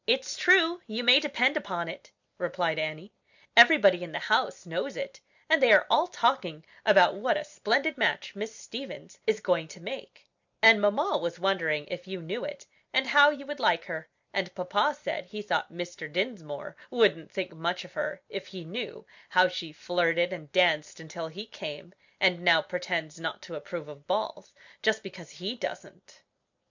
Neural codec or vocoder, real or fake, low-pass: none; real; 7.2 kHz